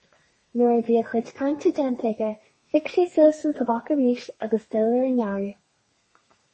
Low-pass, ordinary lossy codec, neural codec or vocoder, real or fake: 10.8 kHz; MP3, 32 kbps; codec, 32 kHz, 1.9 kbps, SNAC; fake